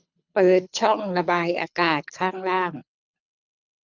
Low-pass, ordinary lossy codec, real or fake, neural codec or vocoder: 7.2 kHz; none; fake; codec, 24 kHz, 6 kbps, HILCodec